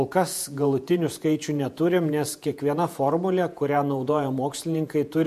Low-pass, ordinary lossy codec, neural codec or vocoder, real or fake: 14.4 kHz; MP3, 64 kbps; vocoder, 48 kHz, 128 mel bands, Vocos; fake